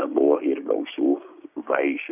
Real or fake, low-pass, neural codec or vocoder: fake; 3.6 kHz; codec, 16 kHz, 6 kbps, DAC